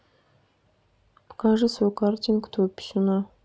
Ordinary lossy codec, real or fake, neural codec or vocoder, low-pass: none; real; none; none